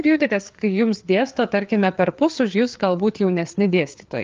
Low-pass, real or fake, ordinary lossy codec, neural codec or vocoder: 7.2 kHz; fake; Opus, 32 kbps; codec, 16 kHz, 4 kbps, FreqCodec, larger model